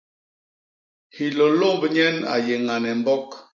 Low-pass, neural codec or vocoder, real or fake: 7.2 kHz; none; real